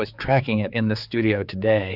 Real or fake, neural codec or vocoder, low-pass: fake; codec, 16 kHz, 4 kbps, X-Codec, HuBERT features, trained on general audio; 5.4 kHz